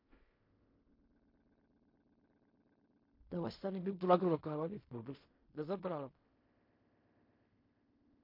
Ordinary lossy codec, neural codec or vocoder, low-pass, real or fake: MP3, 32 kbps; codec, 16 kHz in and 24 kHz out, 0.4 kbps, LongCat-Audio-Codec, fine tuned four codebook decoder; 5.4 kHz; fake